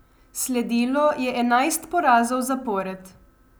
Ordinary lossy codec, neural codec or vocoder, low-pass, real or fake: none; none; none; real